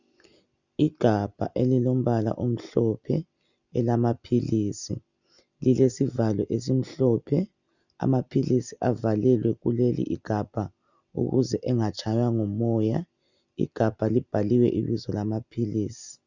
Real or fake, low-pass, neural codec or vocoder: real; 7.2 kHz; none